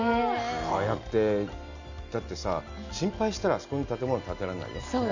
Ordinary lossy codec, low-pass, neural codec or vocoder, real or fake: none; 7.2 kHz; none; real